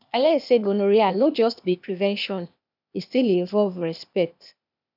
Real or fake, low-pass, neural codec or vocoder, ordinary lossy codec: fake; 5.4 kHz; codec, 16 kHz, 0.8 kbps, ZipCodec; none